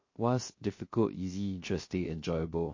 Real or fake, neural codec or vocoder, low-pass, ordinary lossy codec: fake; codec, 16 kHz, 0.3 kbps, FocalCodec; 7.2 kHz; MP3, 32 kbps